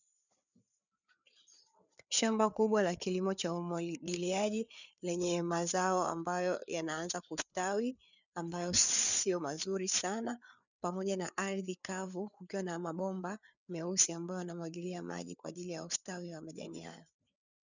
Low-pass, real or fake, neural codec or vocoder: 7.2 kHz; fake; codec, 16 kHz, 4 kbps, FreqCodec, larger model